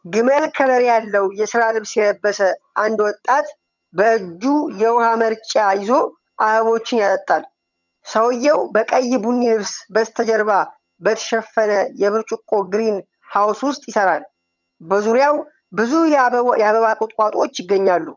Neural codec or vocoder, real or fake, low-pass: vocoder, 22.05 kHz, 80 mel bands, HiFi-GAN; fake; 7.2 kHz